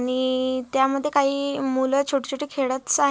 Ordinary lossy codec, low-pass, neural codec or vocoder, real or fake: none; none; none; real